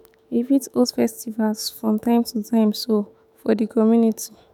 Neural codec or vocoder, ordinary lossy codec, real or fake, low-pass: autoencoder, 48 kHz, 128 numbers a frame, DAC-VAE, trained on Japanese speech; none; fake; 19.8 kHz